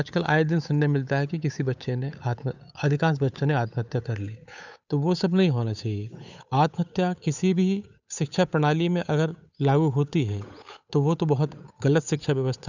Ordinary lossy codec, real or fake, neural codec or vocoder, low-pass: none; fake; codec, 16 kHz, 8 kbps, FunCodec, trained on Chinese and English, 25 frames a second; 7.2 kHz